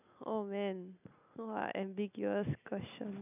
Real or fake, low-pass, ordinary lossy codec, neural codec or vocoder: real; 3.6 kHz; none; none